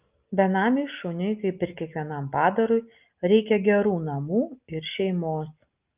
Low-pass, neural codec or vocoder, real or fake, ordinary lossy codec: 3.6 kHz; none; real; Opus, 24 kbps